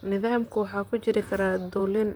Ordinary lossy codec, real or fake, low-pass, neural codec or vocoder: none; fake; none; vocoder, 44.1 kHz, 128 mel bands, Pupu-Vocoder